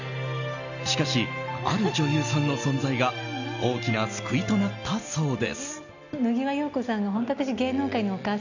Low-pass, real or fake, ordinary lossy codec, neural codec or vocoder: 7.2 kHz; real; none; none